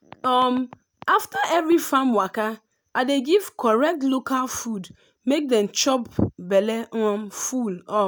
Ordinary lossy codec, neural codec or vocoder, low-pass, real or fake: none; none; none; real